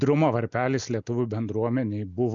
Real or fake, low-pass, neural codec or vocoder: real; 7.2 kHz; none